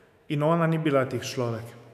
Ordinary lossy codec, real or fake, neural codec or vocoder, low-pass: none; fake; autoencoder, 48 kHz, 128 numbers a frame, DAC-VAE, trained on Japanese speech; 14.4 kHz